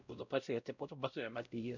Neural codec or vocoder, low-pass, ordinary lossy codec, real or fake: codec, 16 kHz, 0.5 kbps, X-Codec, WavLM features, trained on Multilingual LibriSpeech; 7.2 kHz; none; fake